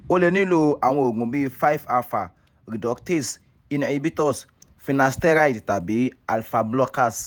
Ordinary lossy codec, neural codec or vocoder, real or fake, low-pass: none; vocoder, 48 kHz, 128 mel bands, Vocos; fake; none